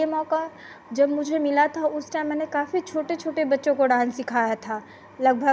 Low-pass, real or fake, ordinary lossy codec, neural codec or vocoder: none; real; none; none